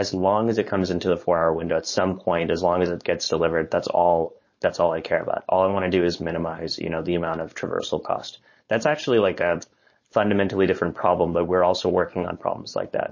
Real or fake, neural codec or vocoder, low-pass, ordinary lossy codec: fake; codec, 16 kHz, 4.8 kbps, FACodec; 7.2 kHz; MP3, 32 kbps